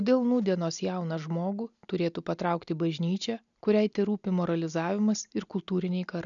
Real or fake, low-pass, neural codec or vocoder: real; 7.2 kHz; none